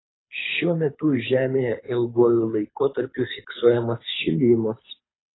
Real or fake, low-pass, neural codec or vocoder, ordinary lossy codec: fake; 7.2 kHz; codec, 24 kHz, 6 kbps, HILCodec; AAC, 16 kbps